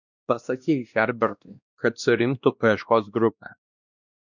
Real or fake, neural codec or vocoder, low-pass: fake; codec, 16 kHz, 1 kbps, X-Codec, WavLM features, trained on Multilingual LibriSpeech; 7.2 kHz